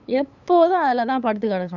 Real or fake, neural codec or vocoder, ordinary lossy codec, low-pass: fake; codec, 16 kHz, 8 kbps, FunCodec, trained on LibriTTS, 25 frames a second; none; 7.2 kHz